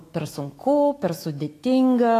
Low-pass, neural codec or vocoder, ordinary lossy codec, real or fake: 14.4 kHz; autoencoder, 48 kHz, 128 numbers a frame, DAC-VAE, trained on Japanese speech; AAC, 48 kbps; fake